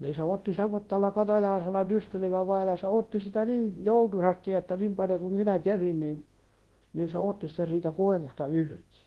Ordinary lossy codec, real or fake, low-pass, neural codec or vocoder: Opus, 16 kbps; fake; 10.8 kHz; codec, 24 kHz, 0.9 kbps, WavTokenizer, large speech release